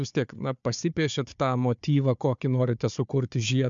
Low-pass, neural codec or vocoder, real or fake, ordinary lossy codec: 7.2 kHz; codec, 16 kHz, 4 kbps, FunCodec, trained on Chinese and English, 50 frames a second; fake; MP3, 64 kbps